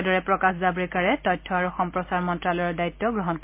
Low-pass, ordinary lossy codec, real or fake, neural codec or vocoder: 3.6 kHz; MP3, 32 kbps; real; none